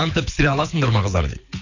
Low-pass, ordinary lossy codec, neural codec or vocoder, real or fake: 7.2 kHz; none; codec, 24 kHz, 6 kbps, HILCodec; fake